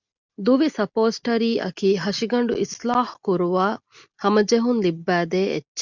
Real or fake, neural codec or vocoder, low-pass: real; none; 7.2 kHz